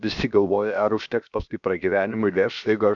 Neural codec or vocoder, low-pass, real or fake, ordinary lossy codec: codec, 16 kHz, 0.7 kbps, FocalCodec; 7.2 kHz; fake; MP3, 96 kbps